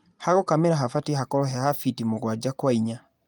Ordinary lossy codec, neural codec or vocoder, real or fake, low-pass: Opus, 32 kbps; none; real; 19.8 kHz